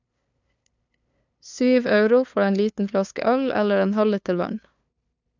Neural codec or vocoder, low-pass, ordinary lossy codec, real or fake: codec, 16 kHz, 2 kbps, FunCodec, trained on LibriTTS, 25 frames a second; 7.2 kHz; none; fake